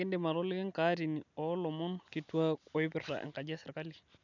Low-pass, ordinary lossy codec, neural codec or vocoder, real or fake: 7.2 kHz; none; none; real